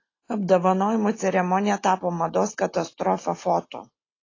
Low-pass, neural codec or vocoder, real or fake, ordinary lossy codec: 7.2 kHz; none; real; AAC, 32 kbps